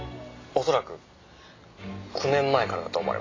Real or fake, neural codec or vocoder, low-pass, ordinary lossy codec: real; none; 7.2 kHz; AAC, 32 kbps